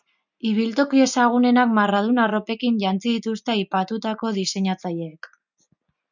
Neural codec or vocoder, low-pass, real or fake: none; 7.2 kHz; real